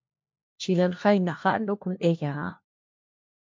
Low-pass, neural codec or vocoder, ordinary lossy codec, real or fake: 7.2 kHz; codec, 16 kHz, 1 kbps, FunCodec, trained on LibriTTS, 50 frames a second; MP3, 48 kbps; fake